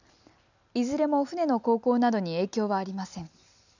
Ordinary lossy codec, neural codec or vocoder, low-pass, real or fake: none; none; 7.2 kHz; real